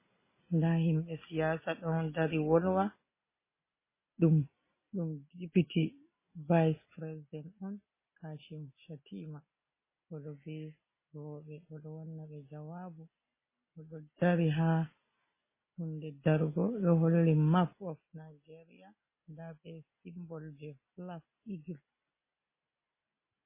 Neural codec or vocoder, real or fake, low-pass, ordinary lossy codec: none; real; 3.6 kHz; MP3, 16 kbps